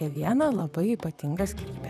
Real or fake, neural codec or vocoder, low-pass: fake; vocoder, 44.1 kHz, 128 mel bands, Pupu-Vocoder; 14.4 kHz